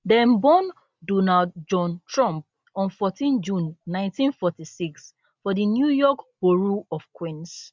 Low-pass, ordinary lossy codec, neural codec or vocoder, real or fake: none; none; none; real